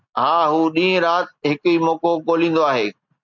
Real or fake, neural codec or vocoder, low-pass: real; none; 7.2 kHz